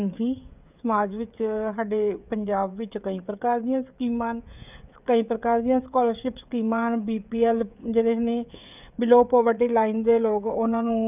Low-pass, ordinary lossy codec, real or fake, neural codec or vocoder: 3.6 kHz; none; fake; codec, 16 kHz, 16 kbps, FreqCodec, smaller model